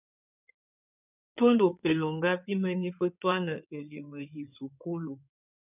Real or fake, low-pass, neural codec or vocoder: fake; 3.6 kHz; codec, 16 kHz in and 24 kHz out, 2.2 kbps, FireRedTTS-2 codec